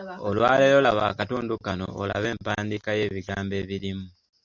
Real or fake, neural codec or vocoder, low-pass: real; none; 7.2 kHz